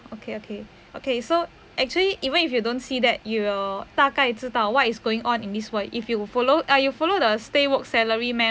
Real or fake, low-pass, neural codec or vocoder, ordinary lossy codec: real; none; none; none